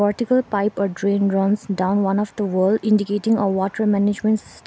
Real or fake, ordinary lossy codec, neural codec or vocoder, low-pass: real; none; none; none